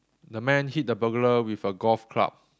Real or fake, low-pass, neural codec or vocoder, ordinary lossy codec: real; none; none; none